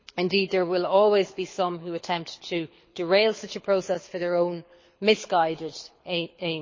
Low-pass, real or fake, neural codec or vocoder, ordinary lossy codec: 7.2 kHz; fake; codec, 24 kHz, 6 kbps, HILCodec; MP3, 32 kbps